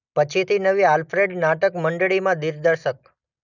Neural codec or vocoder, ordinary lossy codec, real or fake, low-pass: none; none; real; 7.2 kHz